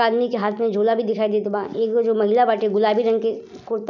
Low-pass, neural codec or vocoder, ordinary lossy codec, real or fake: 7.2 kHz; none; none; real